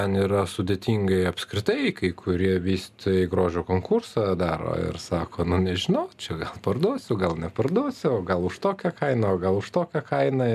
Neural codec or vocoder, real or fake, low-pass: none; real; 14.4 kHz